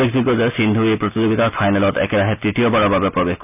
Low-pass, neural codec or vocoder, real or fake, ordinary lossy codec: 3.6 kHz; none; real; none